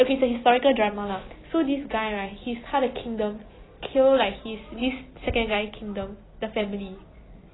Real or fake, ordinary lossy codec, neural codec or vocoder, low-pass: real; AAC, 16 kbps; none; 7.2 kHz